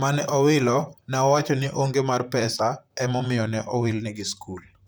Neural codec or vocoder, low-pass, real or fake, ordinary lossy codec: vocoder, 44.1 kHz, 128 mel bands, Pupu-Vocoder; none; fake; none